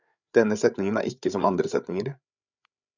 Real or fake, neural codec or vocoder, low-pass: fake; codec, 16 kHz, 8 kbps, FreqCodec, larger model; 7.2 kHz